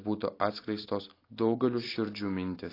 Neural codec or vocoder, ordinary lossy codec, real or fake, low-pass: none; AAC, 24 kbps; real; 5.4 kHz